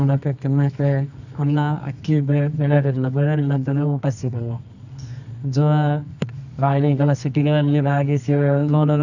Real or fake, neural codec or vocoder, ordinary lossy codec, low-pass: fake; codec, 24 kHz, 0.9 kbps, WavTokenizer, medium music audio release; none; 7.2 kHz